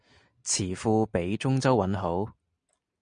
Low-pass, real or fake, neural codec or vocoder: 9.9 kHz; real; none